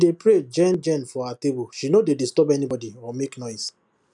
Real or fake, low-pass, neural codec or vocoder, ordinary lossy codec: real; 10.8 kHz; none; none